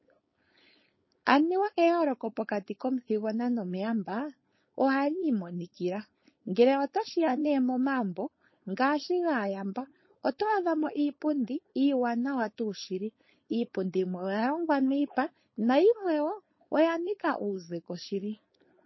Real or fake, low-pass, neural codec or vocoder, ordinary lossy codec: fake; 7.2 kHz; codec, 16 kHz, 4.8 kbps, FACodec; MP3, 24 kbps